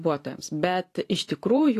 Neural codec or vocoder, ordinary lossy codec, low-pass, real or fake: vocoder, 44.1 kHz, 128 mel bands every 512 samples, BigVGAN v2; AAC, 48 kbps; 14.4 kHz; fake